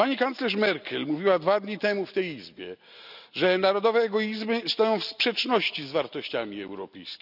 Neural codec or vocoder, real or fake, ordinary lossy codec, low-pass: none; real; none; 5.4 kHz